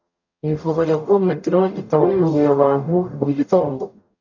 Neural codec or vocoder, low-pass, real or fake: codec, 44.1 kHz, 0.9 kbps, DAC; 7.2 kHz; fake